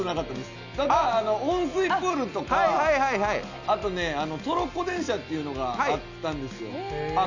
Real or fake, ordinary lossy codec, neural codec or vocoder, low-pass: real; none; none; 7.2 kHz